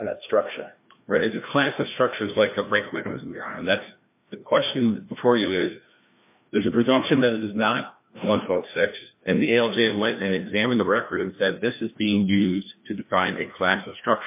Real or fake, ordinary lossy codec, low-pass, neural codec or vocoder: fake; MP3, 24 kbps; 3.6 kHz; codec, 16 kHz, 1 kbps, FreqCodec, larger model